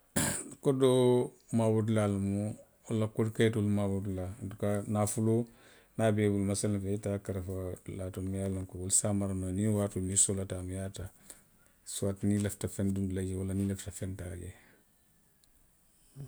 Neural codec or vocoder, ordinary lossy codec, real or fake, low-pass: none; none; real; none